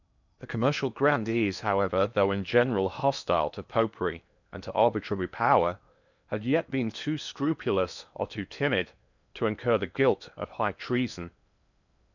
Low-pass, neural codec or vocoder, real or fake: 7.2 kHz; codec, 16 kHz in and 24 kHz out, 0.8 kbps, FocalCodec, streaming, 65536 codes; fake